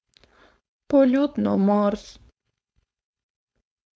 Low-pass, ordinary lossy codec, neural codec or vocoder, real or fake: none; none; codec, 16 kHz, 4.8 kbps, FACodec; fake